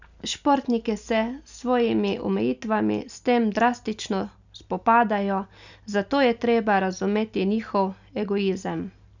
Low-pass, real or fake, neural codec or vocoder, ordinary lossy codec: 7.2 kHz; real; none; none